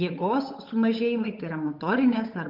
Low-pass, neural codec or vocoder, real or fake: 5.4 kHz; codec, 16 kHz, 8 kbps, FunCodec, trained on Chinese and English, 25 frames a second; fake